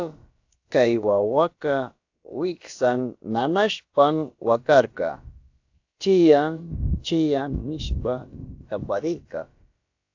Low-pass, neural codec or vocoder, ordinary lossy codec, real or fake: 7.2 kHz; codec, 16 kHz, about 1 kbps, DyCAST, with the encoder's durations; AAC, 48 kbps; fake